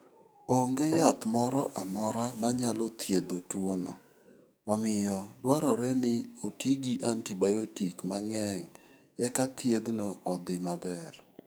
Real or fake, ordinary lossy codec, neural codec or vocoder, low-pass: fake; none; codec, 44.1 kHz, 2.6 kbps, SNAC; none